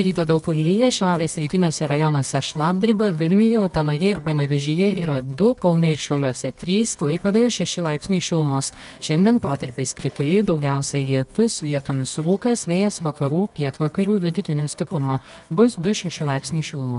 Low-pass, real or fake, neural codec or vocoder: 10.8 kHz; fake; codec, 24 kHz, 0.9 kbps, WavTokenizer, medium music audio release